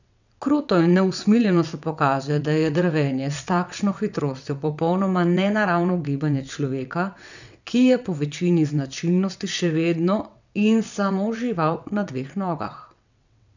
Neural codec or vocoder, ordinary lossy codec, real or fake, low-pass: vocoder, 22.05 kHz, 80 mel bands, WaveNeXt; none; fake; 7.2 kHz